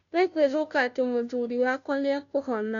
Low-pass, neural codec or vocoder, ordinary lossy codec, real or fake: 7.2 kHz; codec, 16 kHz, 0.5 kbps, FunCodec, trained on Chinese and English, 25 frames a second; none; fake